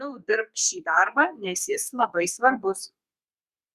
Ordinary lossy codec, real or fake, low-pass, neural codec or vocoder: Opus, 64 kbps; fake; 14.4 kHz; codec, 32 kHz, 1.9 kbps, SNAC